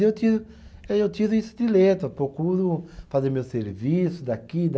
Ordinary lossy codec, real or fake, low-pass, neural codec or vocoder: none; real; none; none